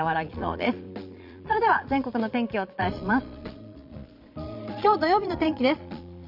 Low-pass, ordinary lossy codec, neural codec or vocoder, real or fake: 5.4 kHz; none; vocoder, 22.05 kHz, 80 mel bands, Vocos; fake